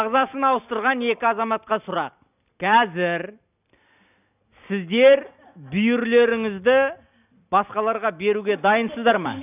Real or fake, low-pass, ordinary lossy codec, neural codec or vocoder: real; 3.6 kHz; none; none